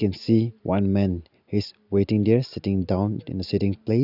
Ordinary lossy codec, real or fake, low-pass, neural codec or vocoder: none; real; 5.4 kHz; none